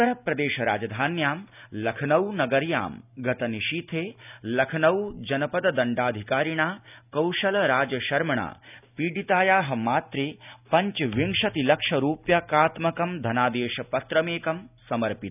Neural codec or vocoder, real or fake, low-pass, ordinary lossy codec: none; real; 3.6 kHz; none